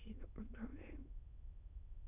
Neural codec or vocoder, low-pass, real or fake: autoencoder, 22.05 kHz, a latent of 192 numbers a frame, VITS, trained on many speakers; 3.6 kHz; fake